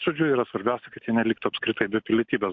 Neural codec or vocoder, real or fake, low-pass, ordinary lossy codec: none; real; 7.2 kHz; MP3, 48 kbps